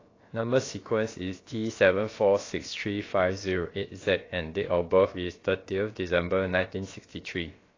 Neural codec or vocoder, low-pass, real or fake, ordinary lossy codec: codec, 16 kHz, about 1 kbps, DyCAST, with the encoder's durations; 7.2 kHz; fake; AAC, 32 kbps